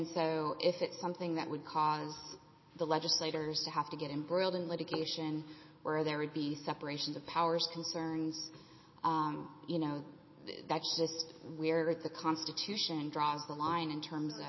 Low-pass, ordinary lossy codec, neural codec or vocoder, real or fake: 7.2 kHz; MP3, 24 kbps; none; real